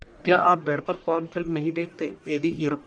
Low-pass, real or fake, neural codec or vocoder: 9.9 kHz; fake; codec, 44.1 kHz, 1.7 kbps, Pupu-Codec